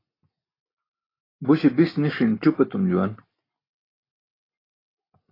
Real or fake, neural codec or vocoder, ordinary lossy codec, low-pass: real; none; AAC, 24 kbps; 5.4 kHz